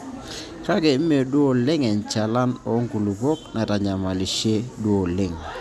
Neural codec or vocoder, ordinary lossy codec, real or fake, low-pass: none; none; real; none